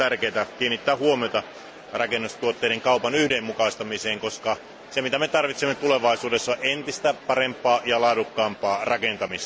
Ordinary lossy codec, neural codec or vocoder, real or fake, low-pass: none; none; real; none